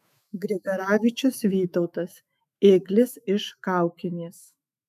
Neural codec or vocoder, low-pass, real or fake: autoencoder, 48 kHz, 128 numbers a frame, DAC-VAE, trained on Japanese speech; 14.4 kHz; fake